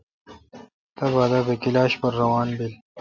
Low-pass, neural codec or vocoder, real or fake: 7.2 kHz; none; real